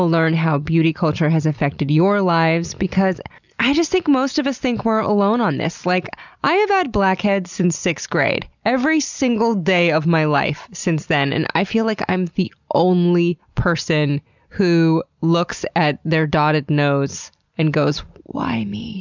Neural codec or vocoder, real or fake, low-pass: none; real; 7.2 kHz